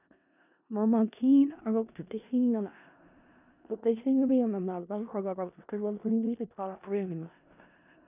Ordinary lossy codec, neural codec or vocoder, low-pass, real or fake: none; codec, 16 kHz in and 24 kHz out, 0.4 kbps, LongCat-Audio-Codec, four codebook decoder; 3.6 kHz; fake